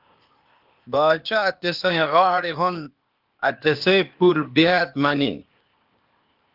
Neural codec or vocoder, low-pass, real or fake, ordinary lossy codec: codec, 16 kHz, 0.8 kbps, ZipCodec; 5.4 kHz; fake; Opus, 24 kbps